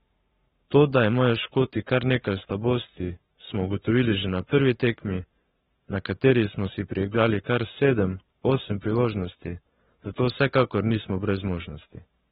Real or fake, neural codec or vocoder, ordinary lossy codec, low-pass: fake; codec, 44.1 kHz, 7.8 kbps, Pupu-Codec; AAC, 16 kbps; 19.8 kHz